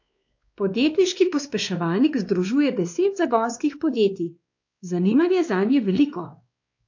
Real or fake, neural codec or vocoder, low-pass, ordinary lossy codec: fake; codec, 16 kHz, 2 kbps, X-Codec, WavLM features, trained on Multilingual LibriSpeech; 7.2 kHz; AAC, 48 kbps